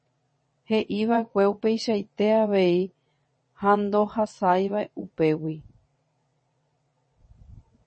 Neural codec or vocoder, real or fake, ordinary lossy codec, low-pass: vocoder, 22.05 kHz, 80 mel bands, WaveNeXt; fake; MP3, 32 kbps; 9.9 kHz